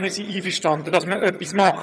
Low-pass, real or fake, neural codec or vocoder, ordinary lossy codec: none; fake; vocoder, 22.05 kHz, 80 mel bands, HiFi-GAN; none